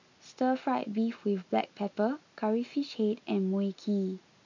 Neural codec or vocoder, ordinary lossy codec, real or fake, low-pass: none; MP3, 64 kbps; real; 7.2 kHz